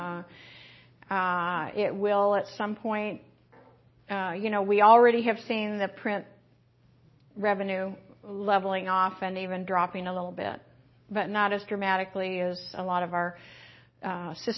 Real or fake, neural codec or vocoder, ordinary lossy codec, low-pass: real; none; MP3, 24 kbps; 7.2 kHz